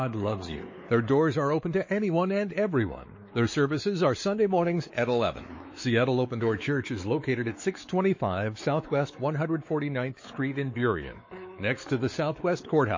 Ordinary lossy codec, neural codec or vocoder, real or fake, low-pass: MP3, 32 kbps; codec, 16 kHz, 4 kbps, X-Codec, WavLM features, trained on Multilingual LibriSpeech; fake; 7.2 kHz